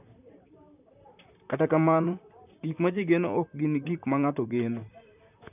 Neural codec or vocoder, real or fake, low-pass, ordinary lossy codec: vocoder, 44.1 kHz, 128 mel bands, Pupu-Vocoder; fake; 3.6 kHz; none